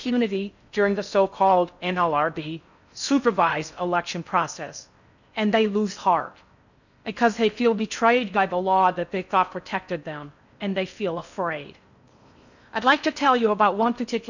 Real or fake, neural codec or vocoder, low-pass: fake; codec, 16 kHz in and 24 kHz out, 0.6 kbps, FocalCodec, streaming, 4096 codes; 7.2 kHz